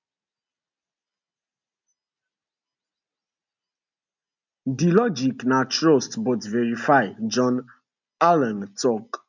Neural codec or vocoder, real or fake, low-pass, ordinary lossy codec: none; real; 7.2 kHz; none